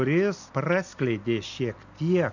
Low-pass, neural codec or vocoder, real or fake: 7.2 kHz; none; real